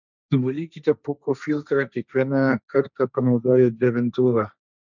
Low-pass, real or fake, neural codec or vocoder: 7.2 kHz; fake; codec, 16 kHz, 1.1 kbps, Voila-Tokenizer